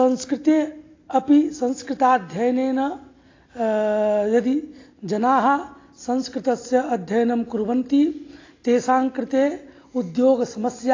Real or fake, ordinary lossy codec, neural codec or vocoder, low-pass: real; AAC, 32 kbps; none; 7.2 kHz